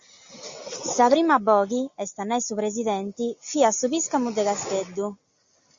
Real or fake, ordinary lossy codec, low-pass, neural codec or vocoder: real; Opus, 64 kbps; 7.2 kHz; none